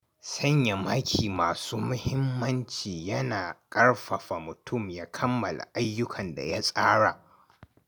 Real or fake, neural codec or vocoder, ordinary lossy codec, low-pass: real; none; none; none